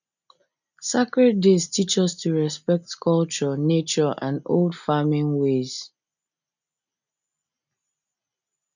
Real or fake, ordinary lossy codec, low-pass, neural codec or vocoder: real; none; 7.2 kHz; none